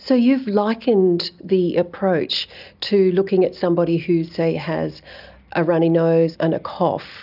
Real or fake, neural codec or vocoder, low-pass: real; none; 5.4 kHz